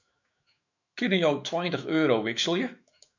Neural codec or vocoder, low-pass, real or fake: autoencoder, 48 kHz, 128 numbers a frame, DAC-VAE, trained on Japanese speech; 7.2 kHz; fake